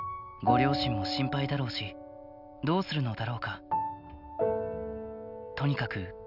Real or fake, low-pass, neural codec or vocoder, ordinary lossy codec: real; 5.4 kHz; none; none